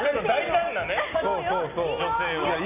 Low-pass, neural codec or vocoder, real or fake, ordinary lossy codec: 3.6 kHz; none; real; none